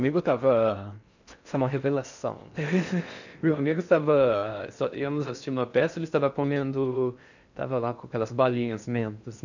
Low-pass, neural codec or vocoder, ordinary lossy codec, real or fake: 7.2 kHz; codec, 16 kHz in and 24 kHz out, 0.6 kbps, FocalCodec, streaming, 4096 codes; none; fake